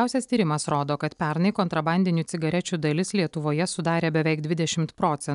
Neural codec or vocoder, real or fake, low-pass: none; real; 10.8 kHz